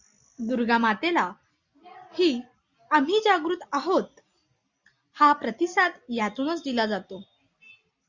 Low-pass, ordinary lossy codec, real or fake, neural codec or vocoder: 7.2 kHz; Opus, 64 kbps; real; none